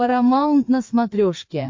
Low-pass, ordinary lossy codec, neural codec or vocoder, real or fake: 7.2 kHz; MP3, 48 kbps; codec, 16 kHz, 4 kbps, FreqCodec, larger model; fake